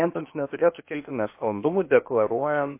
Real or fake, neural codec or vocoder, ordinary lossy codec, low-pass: fake; codec, 16 kHz, 0.8 kbps, ZipCodec; MP3, 24 kbps; 3.6 kHz